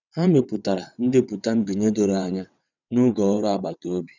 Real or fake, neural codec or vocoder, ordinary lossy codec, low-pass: fake; vocoder, 22.05 kHz, 80 mel bands, WaveNeXt; none; 7.2 kHz